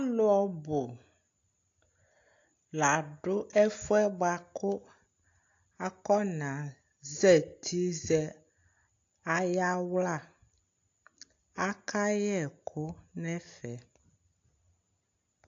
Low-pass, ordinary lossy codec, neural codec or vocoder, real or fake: 7.2 kHz; AAC, 48 kbps; none; real